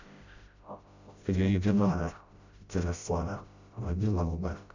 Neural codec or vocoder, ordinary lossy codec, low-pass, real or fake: codec, 16 kHz, 0.5 kbps, FreqCodec, smaller model; Opus, 64 kbps; 7.2 kHz; fake